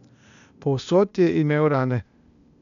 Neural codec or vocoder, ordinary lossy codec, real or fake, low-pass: codec, 16 kHz, 0.8 kbps, ZipCodec; none; fake; 7.2 kHz